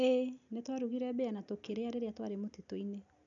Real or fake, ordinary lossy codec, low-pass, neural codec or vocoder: real; none; 7.2 kHz; none